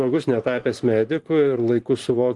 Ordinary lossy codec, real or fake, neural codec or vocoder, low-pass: Opus, 24 kbps; fake; vocoder, 44.1 kHz, 128 mel bands every 512 samples, BigVGAN v2; 10.8 kHz